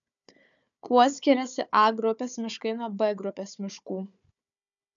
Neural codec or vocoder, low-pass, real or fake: codec, 16 kHz, 4 kbps, FunCodec, trained on Chinese and English, 50 frames a second; 7.2 kHz; fake